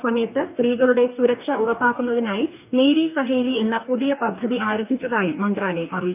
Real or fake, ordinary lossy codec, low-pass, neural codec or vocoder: fake; none; 3.6 kHz; codec, 44.1 kHz, 2.6 kbps, DAC